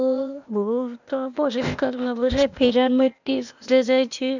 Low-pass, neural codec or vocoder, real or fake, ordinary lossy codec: 7.2 kHz; codec, 16 kHz, 0.8 kbps, ZipCodec; fake; none